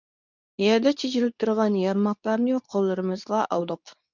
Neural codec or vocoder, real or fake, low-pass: codec, 24 kHz, 0.9 kbps, WavTokenizer, medium speech release version 2; fake; 7.2 kHz